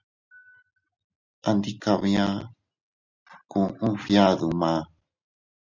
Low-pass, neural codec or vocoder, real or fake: 7.2 kHz; none; real